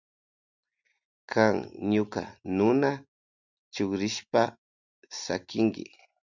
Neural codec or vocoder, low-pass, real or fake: none; 7.2 kHz; real